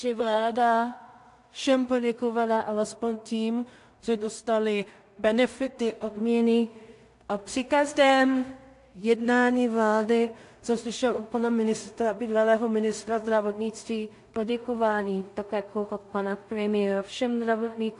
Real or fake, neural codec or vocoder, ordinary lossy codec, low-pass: fake; codec, 16 kHz in and 24 kHz out, 0.4 kbps, LongCat-Audio-Codec, two codebook decoder; AAC, 64 kbps; 10.8 kHz